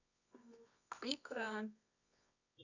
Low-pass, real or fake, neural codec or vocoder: 7.2 kHz; fake; codec, 24 kHz, 0.9 kbps, WavTokenizer, medium music audio release